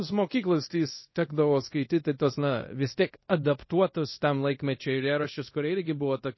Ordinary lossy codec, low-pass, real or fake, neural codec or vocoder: MP3, 24 kbps; 7.2 kHz; fake; codec, 24 kHz, 0.5 kbps, DualCodec